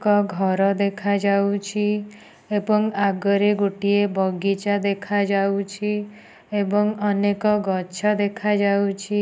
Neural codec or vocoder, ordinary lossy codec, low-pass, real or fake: none; none; none; real